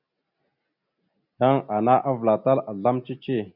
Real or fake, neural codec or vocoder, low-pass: real; none; 5.4 kHz